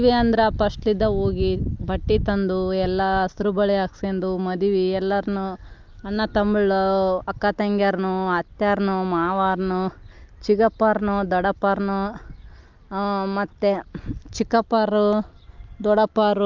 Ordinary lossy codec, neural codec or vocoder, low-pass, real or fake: Opus, 24 kbps; none; 7.2 kHz; real